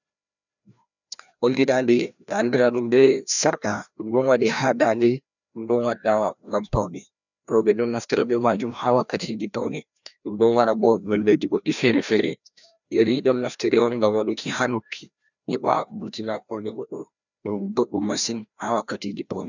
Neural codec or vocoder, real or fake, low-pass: codec, 16 kHz, 1 kbps, FreqCodec, larger model; fake; 7.2 kHz